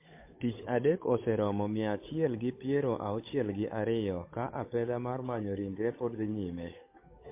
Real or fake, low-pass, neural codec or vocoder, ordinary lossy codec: fake; 3.6 kHz; codec, 16 kHz, 8 kbps, FunCodec, trained on Chinese and English, 25 frames a second; MP3, 24 kbps